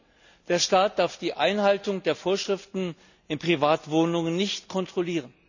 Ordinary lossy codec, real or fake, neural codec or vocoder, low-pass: none; real; none; 7.2 kHz